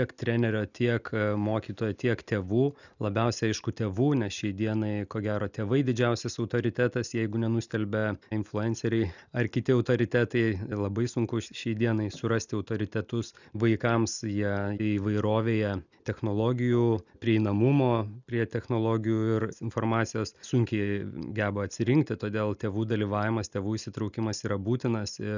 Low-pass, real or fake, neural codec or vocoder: 7.2 kHz; real; none